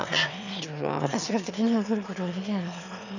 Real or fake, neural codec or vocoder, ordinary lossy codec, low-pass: fake; autoencoder, 22.05 kHz, a latent of 192 numbers a frame, VITS, trained on one speaker; none; 7.2 kHz